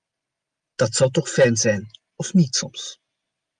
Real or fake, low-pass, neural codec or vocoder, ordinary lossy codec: real; 9.9 kHz; none; Opus, 32 kbps